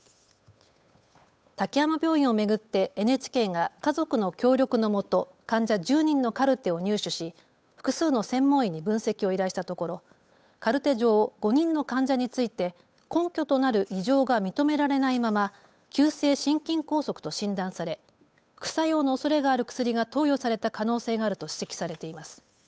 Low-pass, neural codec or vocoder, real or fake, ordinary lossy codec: none; codec, 16 kHz, 8 kbps, FunCodec, trained on Chinese and English, 25 frames a second; fake; none